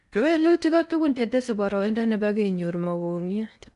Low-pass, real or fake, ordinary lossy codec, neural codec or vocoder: 10.8 kHz; fake; none; codec, 16 kHz in and 24 kHz out, 0.6 kbps, FocalCodec, streaming, 4096 codes